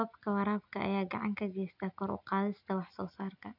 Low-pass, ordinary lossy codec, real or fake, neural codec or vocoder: 5.4 kHz; none; real; none